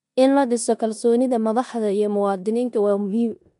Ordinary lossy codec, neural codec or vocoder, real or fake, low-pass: none; codec, 16 kHz in and 24 kHz out, 0.9 kbps, LongCat-Audio-Codec, four codebook decoder; fake; 10.8 kHz